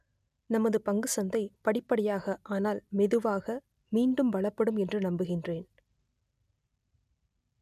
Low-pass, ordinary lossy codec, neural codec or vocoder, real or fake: 14.4 kHz; none; none; real